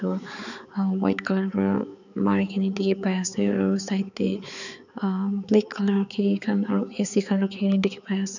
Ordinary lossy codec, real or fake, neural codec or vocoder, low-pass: none; fake; codec, 16 kHz, 4 kbps, X-Codec, HuBERT features, trained on balanced general audio; 7.2 kHz